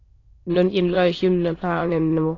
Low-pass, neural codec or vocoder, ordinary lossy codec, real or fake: 7.2 kHz; autoencoder, 22.05 kHz, a latent of 192 numbers a frame, VITS, trained on many speakers; AAC, 32 kbps; fake